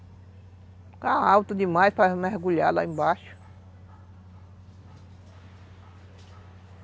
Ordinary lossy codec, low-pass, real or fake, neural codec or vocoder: none; none; real; none